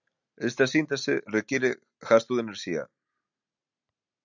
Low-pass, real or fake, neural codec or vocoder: 7.2 kHz; real; none